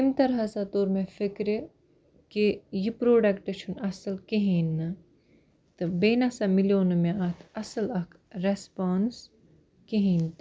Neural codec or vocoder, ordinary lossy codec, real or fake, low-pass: none; none; real; none